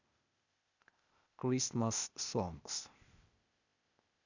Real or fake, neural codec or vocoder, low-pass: fake; codec, 16 kHz, 0.8 kbps, ZipCodec; 7.2 kHz